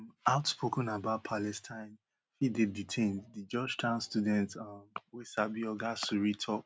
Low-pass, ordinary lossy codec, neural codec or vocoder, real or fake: none; none; none; real